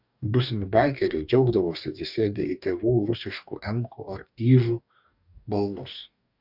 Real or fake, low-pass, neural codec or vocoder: fake; 5.4 kHz; codec, 44.1 kHz, 2.6 kbps, DAC